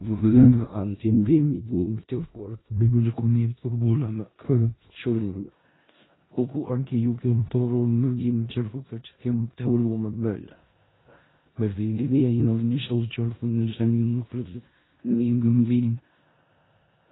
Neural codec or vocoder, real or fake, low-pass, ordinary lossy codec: codec, 16 kHz in and 24 kHz out, 0.4 kbps, LongCat-Audio-Codec, four codebook decoder; fake; 7.2 kHz; AAC, 16 kbps